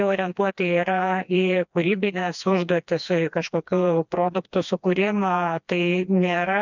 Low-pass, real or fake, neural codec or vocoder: 7.2 kHz; fake; codec, 16 kHz, 2 kbps, FreqCodec, smaller model